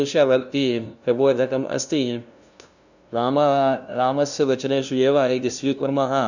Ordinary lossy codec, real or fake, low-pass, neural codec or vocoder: none; fake; 7.2 kHz; codec, 16 kHz, 0.5 kbps, FunCodec, trained on LibriTTS, 25 frames a second